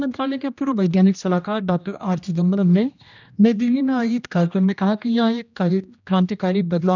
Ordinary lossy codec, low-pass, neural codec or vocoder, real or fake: none; 7.2 kHz; codec, 16 kHz, 1 kbps, X-Codec, HuBERT features, trained on general audio; fake